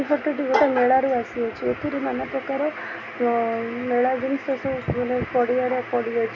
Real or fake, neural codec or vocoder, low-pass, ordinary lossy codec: real; none; 7.2 kHz; none